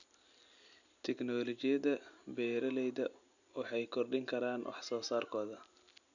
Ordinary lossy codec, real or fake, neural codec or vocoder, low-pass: none; real; none; 7.2 kHz